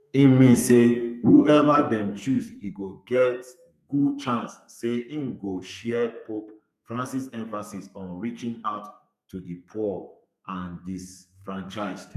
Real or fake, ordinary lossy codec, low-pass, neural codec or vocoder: fake; none; 14.4 kHz; codec, 32 kHz, 1.9 kbps, SNAC